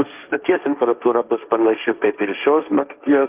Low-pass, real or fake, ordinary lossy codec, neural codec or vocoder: 3.6 kHz; fake; Opus, 32 kbps; codec, 16 kHz, 1.1 kbps, Voila-Tokenizer